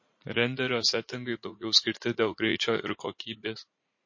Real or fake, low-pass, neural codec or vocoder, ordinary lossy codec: fake; 7.2 kHz; vocoder, 44.1 kHz, 80 mel bands, Vocos; MP3, 32 kbps